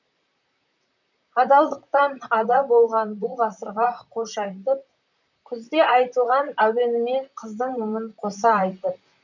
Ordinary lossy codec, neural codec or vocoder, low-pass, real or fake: none; vocoder, 44.1 kHz, 128 mel bands, Pupu-Vocoder; 7.2 kHz; fake